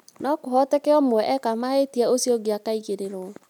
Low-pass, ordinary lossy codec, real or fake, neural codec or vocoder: 19.8 kHz; none; real; none